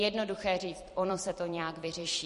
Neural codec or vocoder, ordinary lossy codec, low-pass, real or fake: vocoder, 44.1 kHz, 128 mel bands every 256 samples, BigVGAN v2; MP3, 48 kbps; 14.4 kHz; fake